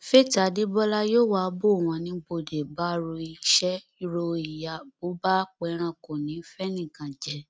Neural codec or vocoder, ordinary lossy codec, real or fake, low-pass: none; none; real; none